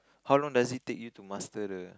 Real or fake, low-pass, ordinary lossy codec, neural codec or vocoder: real; none; none; none